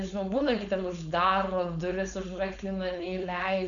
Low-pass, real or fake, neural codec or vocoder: 7.2 kHz; fake; codec, 16 kHz, 4.8 kbps, FACodec